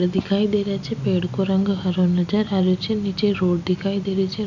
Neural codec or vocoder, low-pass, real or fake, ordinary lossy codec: none; 7.2 kHz; real; none